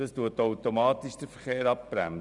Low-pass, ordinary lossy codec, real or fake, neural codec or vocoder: 10.8 kHz; none; fake; vocoder, 44.1 kHz, 128 mel bands every 512 samples, BigVGAN v2